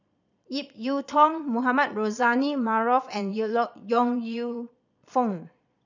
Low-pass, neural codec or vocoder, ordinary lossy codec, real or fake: 7.2 kHz; vocoder, 22.05 kHz, 80 mel bands, Vocos; none; fake